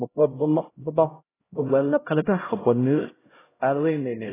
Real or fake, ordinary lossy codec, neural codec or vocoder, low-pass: fake; AAC, 16 kbps; codec, 16 kHz, 0.5 kbps, X-Codec, HuBERT features, trained on LibriSpeech; 3.6 kHz